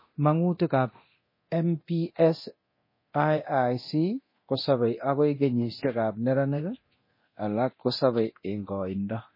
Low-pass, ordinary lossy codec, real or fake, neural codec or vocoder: 5.4 kHz; MP3, 24 kbps; fake; codec, 24 kHz, 0.9 kbps, DualCodec